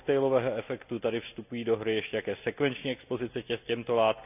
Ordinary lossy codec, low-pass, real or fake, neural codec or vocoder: none; 3.6 kHz; real; none